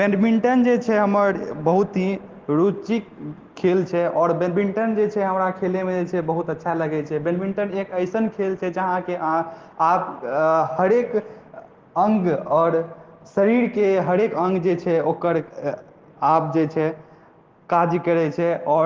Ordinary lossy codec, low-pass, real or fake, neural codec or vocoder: Opus, 16 kbps; 7.2 kHz; real; none